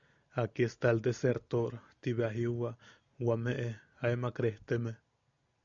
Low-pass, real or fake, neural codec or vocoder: 7.2 kHz; real; none